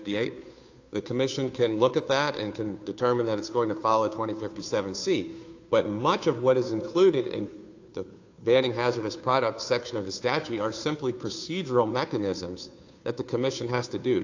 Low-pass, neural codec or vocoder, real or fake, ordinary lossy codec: 7.2 kHz; codec, 16 kHz, 2 kbps, FunCodec, trained on Chinese and English, 25 frames a second; fake; AAC, 48 kbps